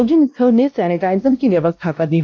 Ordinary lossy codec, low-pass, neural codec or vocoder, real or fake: none; none; codec, 16 kHz, 1 kbps, X-Codec, WavLM features, trained on Multilingual LibriSpeech; fake